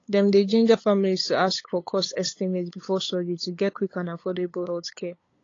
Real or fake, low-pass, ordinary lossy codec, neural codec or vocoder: fake; 7.2 kHz; AAC, 32 kbps; codec, 16 kHz, 8 kbps, FunCodec, trained on LibriTTS, 25 frames a second